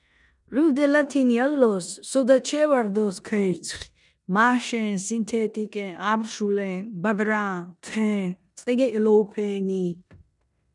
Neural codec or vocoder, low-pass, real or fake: codec, 16 kHz in and 24 kHz out, 0.9 kbps, LongCat-Audio-Codec, four codebook decoder; 10.8 kHz; fake